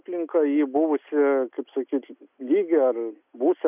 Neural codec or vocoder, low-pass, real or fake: none; 3.6 kHz; real